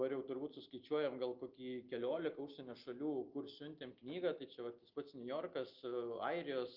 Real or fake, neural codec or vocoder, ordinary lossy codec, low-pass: real; none; Opus, 24 kbps; 5.4 kHz